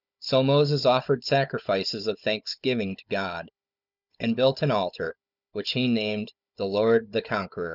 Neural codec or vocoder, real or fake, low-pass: codec, 16 kHz, 16 kbps, FunCodec, trained on Chinese and English, 50 frames a second; fake; 5.4 kHz